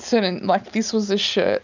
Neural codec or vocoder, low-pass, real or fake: none; 7.2 kHz; real